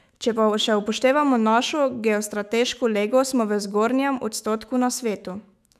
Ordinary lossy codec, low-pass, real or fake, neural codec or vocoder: none; 14.4 kHz; fake; autoencoder, 48 kHz, 128 numbers a frame, DAC-VAE, trained on Japanese speech